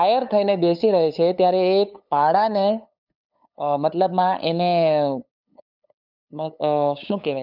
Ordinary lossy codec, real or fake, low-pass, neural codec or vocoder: none; fake; 5.4 kHz; codec, 16 kHz, 8 kbps, FunCodec, trained on LibriTTS, 25 frames a second